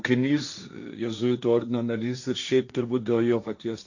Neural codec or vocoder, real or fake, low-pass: codec, 16 kHz, 1.1 kbps, Voila-Tokenizer; fake; 7.2 kHz